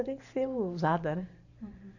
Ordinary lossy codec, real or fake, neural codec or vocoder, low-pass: none; fake; vocoder, 44.1 kHz, 128 mel bands every 512 samples, BigVGAN v2; 7.2 kHz